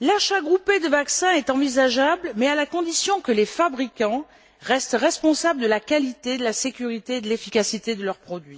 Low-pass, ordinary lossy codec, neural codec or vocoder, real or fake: none; none; none; real